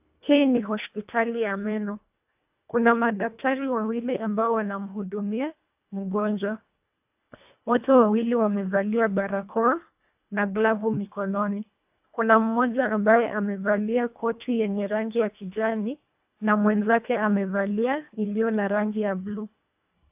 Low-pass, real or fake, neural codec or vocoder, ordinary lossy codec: 3.6 kHz; fake; codec, 24 kHz, 1.5 kbps, HILCodec; AAC, 32 kbps